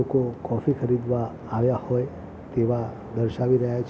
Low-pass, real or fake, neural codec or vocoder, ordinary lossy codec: none; real; none; none